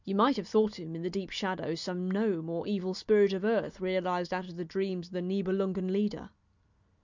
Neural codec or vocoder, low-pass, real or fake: none; 7.2 kHz; real